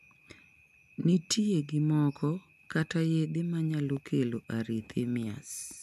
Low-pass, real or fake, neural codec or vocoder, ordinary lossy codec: 14.4 kHz; real; none; none